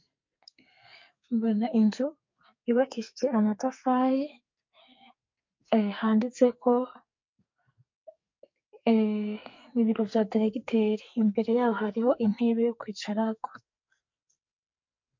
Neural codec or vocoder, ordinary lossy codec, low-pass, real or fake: codec, 44.1 kHz, 2.6 kbps, SNAC; MP3, 48 kbps; 7.2 kHz; fake